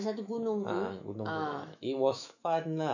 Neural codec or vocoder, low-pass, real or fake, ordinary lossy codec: autoencoder, 48 kHz, 128 numbers a frame, DAC-VAE, trained on Japanese speech; 7.2 kHz; fake; none